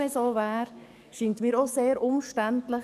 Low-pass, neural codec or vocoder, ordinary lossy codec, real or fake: 14.4 kHz; codec, 44.1 kHz, 7.8 kbps, DAC; none; fake